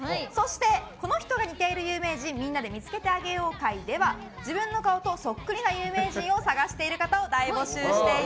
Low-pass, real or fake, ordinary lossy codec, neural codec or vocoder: none; real; none; none